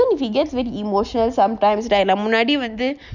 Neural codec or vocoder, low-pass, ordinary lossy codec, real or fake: none; 7.2 kHz; none; real